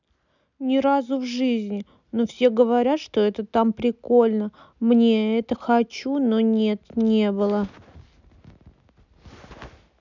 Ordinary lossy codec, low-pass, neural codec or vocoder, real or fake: none; 7.2 kHz; none; real